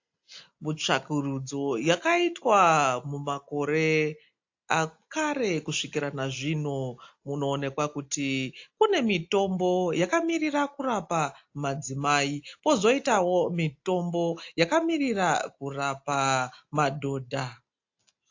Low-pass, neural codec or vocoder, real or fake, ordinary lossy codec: 7.2 kHz; none; real; AAC, 48 kbps